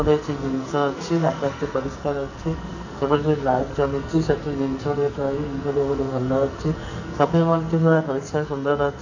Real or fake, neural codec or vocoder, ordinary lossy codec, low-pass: fake; codec, 44.1 kHz, 2.6 kbps, SNAC; none; 7.2 kHz